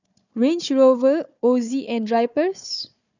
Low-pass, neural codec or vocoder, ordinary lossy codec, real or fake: 7.2 kHz; codec, 16 kHz, 4 kbps, FunCodec, trained on Chinese and English, 50 frames a second; none; fake